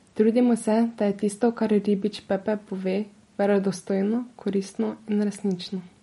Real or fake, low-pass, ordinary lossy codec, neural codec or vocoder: real; 19.8 kHz; MP3, 48 kbps; none